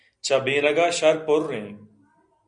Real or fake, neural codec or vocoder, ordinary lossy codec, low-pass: real; none; Opus, 64 kbps; 9.9 kHz